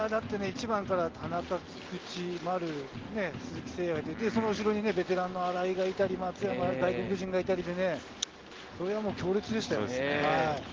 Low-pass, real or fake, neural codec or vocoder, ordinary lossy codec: 7.2 kHz; real; none; Opus, 16 kbps